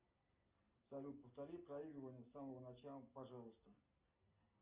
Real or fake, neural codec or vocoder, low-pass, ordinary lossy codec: real; none; 3.6 kHz; Opus, 32 kbps